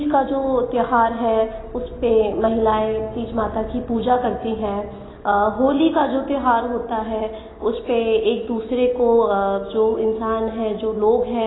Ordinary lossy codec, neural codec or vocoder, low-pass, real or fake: AAC, 16 kbps; none; 7.2 kHz; real